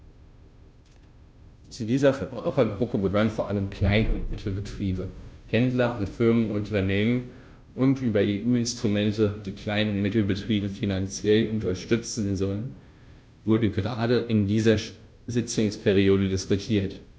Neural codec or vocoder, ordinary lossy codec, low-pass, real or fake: codec, 16 kHz, 0.5 kbps, FunCodec, trained on Chinese and English, 25 frames a second; none; none; fake